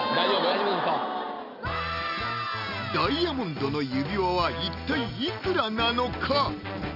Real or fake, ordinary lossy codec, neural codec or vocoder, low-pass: real; none; none; 5.4 kHz